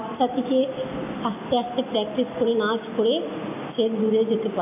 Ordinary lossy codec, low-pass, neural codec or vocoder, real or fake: none; 3.6 kHz; none; real